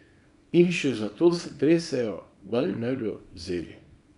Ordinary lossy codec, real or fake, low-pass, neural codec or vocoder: none; fake; 10.8 kHz; codec, 24 kHz, 0.9 kbps, WavTokenizer, small release